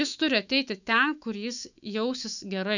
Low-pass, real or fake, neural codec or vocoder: 7.2 kHz; fake; autoencoder, 48 kHz, 128 numbers a frame, DAC-VAE, trained on Japanese speech